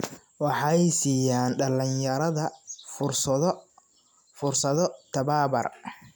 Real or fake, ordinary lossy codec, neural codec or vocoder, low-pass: real; none; none; none